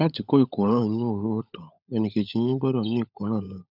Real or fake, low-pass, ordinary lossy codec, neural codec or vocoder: fake; 5.4 kHz; none; codec, 16 kHz, 16 kbps, FunCodec, trained on LibriTTS, 50 frames a second